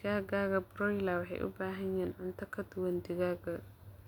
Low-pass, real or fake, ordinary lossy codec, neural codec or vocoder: 19.8 kHz; real; none; none